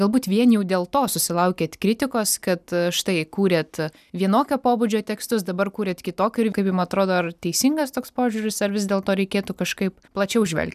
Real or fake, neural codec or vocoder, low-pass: real; none; 14.4 kHz